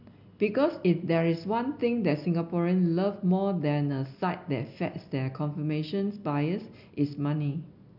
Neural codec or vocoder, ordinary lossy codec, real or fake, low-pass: none; none; real; 5.4 kHz